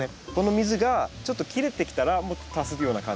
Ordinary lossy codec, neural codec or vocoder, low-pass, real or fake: none; none; none; real